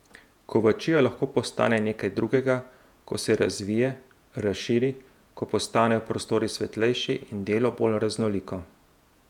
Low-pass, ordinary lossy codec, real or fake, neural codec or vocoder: 19.8 kHz; none; fake; vocoder, 48 kHz, 128 mel bands, Vocos